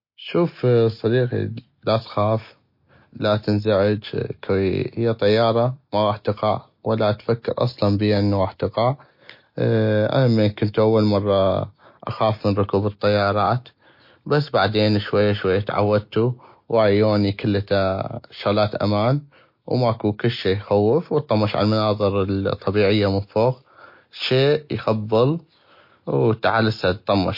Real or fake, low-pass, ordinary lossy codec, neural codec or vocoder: real; 5.4 kHz; MP3, 32 kbps; none